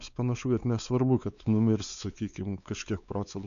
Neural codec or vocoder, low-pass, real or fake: codec, 16 kHz, 4 kbps, X-Codec, WavLM features, trained on Multilingual LibriSpeech; 7.2 kHz; fake